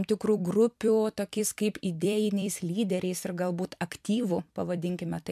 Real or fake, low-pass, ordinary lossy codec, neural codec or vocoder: fake; 14.4 kHz; MP3, 96 kbps; vocoder, 44.1 kHz, 128 mel bands every 256 samples, BigVGAN v2